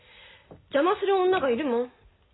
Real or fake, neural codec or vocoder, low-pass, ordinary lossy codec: real; none; 7.2 kHz; AAC, 16 kbps